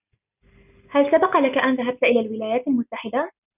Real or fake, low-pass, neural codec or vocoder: real; 3.6 kHz; none